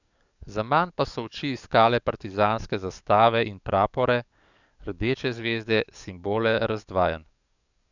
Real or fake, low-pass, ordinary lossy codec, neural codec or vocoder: fake; 7.2 kHz; none; codec, 44.1 kHz, 7.8 kbps, DAC